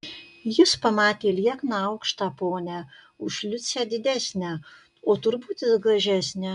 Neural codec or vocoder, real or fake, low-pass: none; real; 10.8 kHz